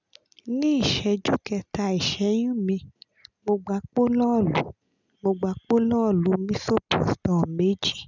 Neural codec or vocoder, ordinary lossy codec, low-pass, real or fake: none; none; 7.2 kHz; real